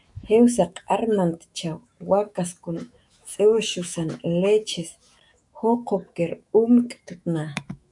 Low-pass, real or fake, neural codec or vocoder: 10.8 kHz; fake; autoencoder, 48 kHz, 128 numbers a frame, DAC-VAE, trained on Japanese speech